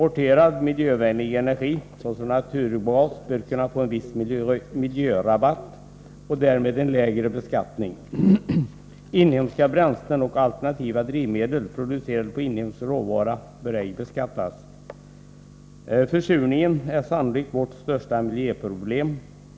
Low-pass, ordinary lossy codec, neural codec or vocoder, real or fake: none; none; none; real